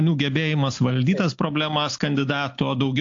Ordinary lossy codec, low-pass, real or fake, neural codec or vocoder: AAC, 48 kbps; 7.2 kHz; real; none